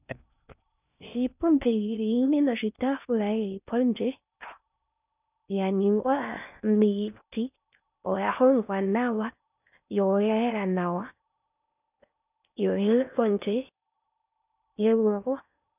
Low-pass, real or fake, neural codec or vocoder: 3.6 kHz; fake; codec, 16 kHz in and 24 kHz out, 0.6 kbps, FocalCodec, streaming, 2048 codes